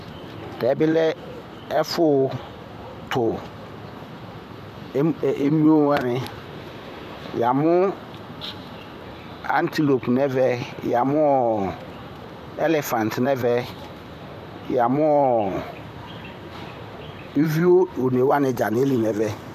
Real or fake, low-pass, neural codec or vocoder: fake; 14.4 kHz; vocoder, 44.1 kHz, 128 mel bands, Pupu-Vocoder